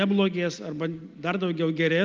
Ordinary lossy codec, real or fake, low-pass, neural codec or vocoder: Opus, 32 kbps; real; 7.2 kHz; none